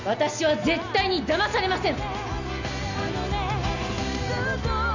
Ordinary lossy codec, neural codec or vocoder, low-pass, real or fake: none; none; 7.2 kHz; real